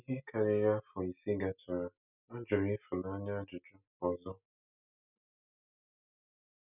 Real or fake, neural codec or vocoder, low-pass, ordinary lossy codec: real; none; 3.6 kHz; none